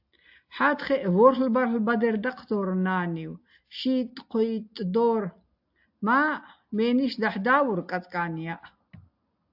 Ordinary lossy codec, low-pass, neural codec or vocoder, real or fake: AAC, 48 kbps; 5.4 kHz; none; real